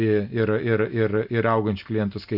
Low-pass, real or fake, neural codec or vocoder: 5.4 kHz; real; none